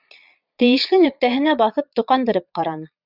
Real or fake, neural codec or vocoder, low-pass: fake; vocoder, 22.05 kHz, 80 mel bands, Vocos; 5.4 kHz